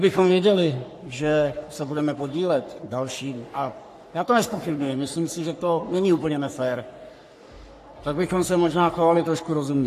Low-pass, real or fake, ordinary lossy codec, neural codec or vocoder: 14.4 kHz; fake; AAC, 64 kbps; codec, 44.1 kHz, 3.4 kbps, Pupu-Codec